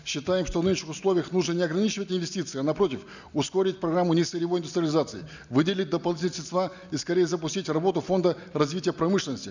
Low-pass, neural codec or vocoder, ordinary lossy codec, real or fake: 7.2 kHz; none; none; real